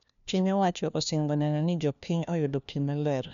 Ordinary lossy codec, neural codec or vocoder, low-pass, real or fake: none; codec, 16 kHz, 1 kbps, FunCodec, trained on LibriTTS, 50 frames a second; 7.2 kHz; fake